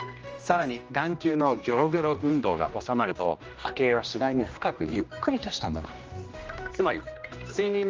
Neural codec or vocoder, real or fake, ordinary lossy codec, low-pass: codec, 16 kHz, 1 kbps, X-Codec, HuBERT features, trained on general audio; fake; Opus, 24 kbps; 7.2 kHz